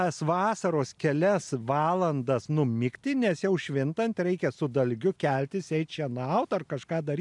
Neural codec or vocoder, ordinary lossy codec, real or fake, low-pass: none; MP3, 96 kbps; real; 10.8 kHz